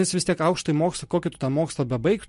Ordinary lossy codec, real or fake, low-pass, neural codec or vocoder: MP3, 48 kbps; real; 10.8 kHz; none